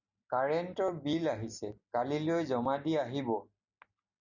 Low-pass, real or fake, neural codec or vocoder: 7.2 kHz; real; none